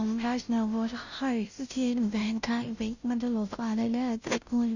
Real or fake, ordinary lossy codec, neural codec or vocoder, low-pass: fake; none; codec, 16 kHz, 0.5 kbps, FunCodec, trained on Chinese and English, 25 frames a second; 7.2 kHz